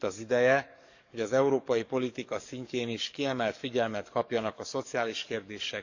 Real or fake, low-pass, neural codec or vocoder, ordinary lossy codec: fake; 7.2 kHz; codec, 44.1 kHz, 7.8 kbps, DAC; none